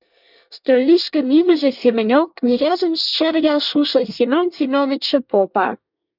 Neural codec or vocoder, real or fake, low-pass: codec, 24 kHz, 1 kbps, SNAC; fake; 5.4 kHz